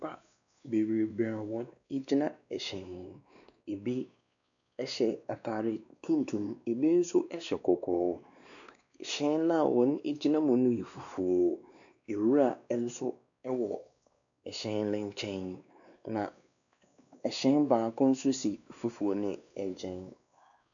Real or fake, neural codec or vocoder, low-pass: fake; codec, 16 kHz, 2 kbps, X-Codec, WavLM features, trained on Multilingual LibriSpeech; 7.2 kHz